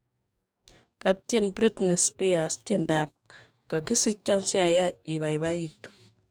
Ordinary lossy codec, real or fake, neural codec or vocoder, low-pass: none; fake; codec, 44.1 kHz, 2.6 kbps, DAC; none